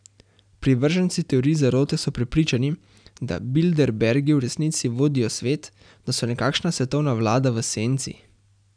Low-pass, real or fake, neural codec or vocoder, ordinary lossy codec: 9.9 kHz; real; none; none